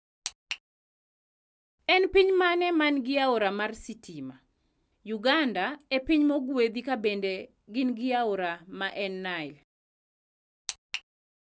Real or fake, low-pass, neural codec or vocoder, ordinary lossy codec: real; none; none; none